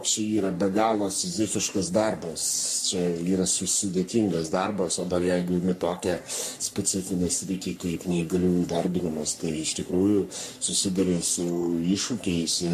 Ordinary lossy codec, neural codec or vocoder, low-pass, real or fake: MP3, 64 kbps; codec, 44.1 kHz, 3.4 kbps, Pupu-Codec; 14.4 kHz; fake